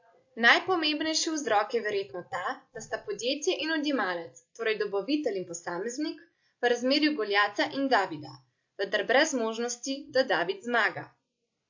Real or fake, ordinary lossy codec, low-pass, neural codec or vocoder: real; AAC, 48 kbps; 7.2 kHz; none